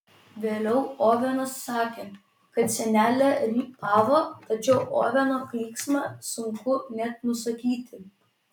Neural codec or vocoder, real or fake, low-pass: none; real; 19.8 kHz